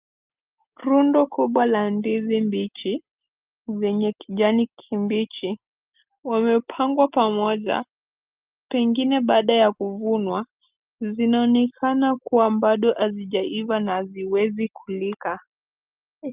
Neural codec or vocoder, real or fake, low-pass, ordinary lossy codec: none; real; 3.6 kHz; Opus, 32 kbps